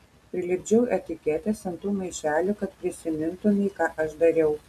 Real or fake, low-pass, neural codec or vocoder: real; 14.4 kHz; none